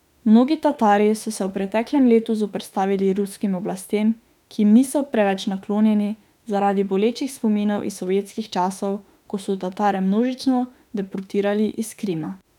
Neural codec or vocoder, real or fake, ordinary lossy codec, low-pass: autoencoder, 48 kHz, 32 numbers a frame, DAC-VAE, trained on Japanese speech; fake; none; 19.8 kHz